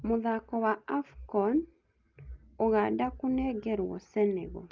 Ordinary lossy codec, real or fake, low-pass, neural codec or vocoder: Opus, 32 kbps; real; 7.2 kHz; none